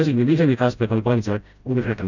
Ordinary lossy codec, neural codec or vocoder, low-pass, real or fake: none; codec, 16 kHz, 0.5 kbps, FreqCodec, smaller model; 7.2 kHz; fake